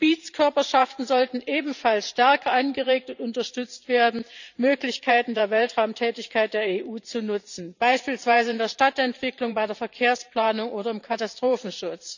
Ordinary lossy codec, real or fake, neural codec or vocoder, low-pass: none; fake; vocoder, 44.1 kHz, 128 mel bands every 512 samples, BigVGAN v2; 7.2 kHz